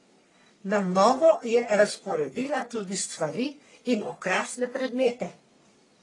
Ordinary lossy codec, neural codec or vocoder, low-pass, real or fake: AAC, 32 kbps; codec, 44.1 kHz, 1.7 kbps, Pupu-Codec; 10.8 kHz; fake